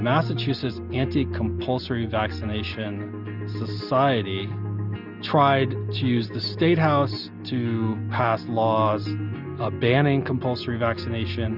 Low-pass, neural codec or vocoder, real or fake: 5.4 kHz; none; real